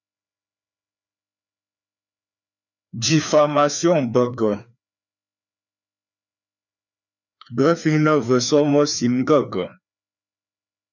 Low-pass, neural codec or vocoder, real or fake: 7.2 kHz; codec, 16 kHz, 2 kbps, FreqCodec, larger model; fake